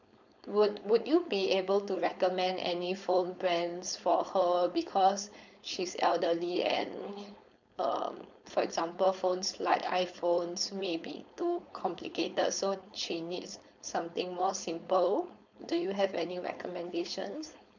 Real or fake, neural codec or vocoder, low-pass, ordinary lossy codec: fake; codec, 16 kHz, 4.8 kbps, FACodec; 7.2 kHz; none